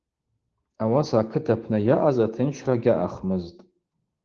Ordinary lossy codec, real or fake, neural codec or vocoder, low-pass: Opus, 16 kbps; fake; codec, 16 kHz, 6 kbps, DAC; 7.2 kHz